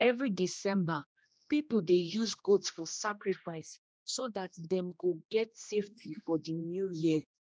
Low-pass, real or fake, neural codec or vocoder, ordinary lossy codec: none; fake; codec, 16 kHz, 1 kbps, X-Codec, HuBERT features, trained on general audio; none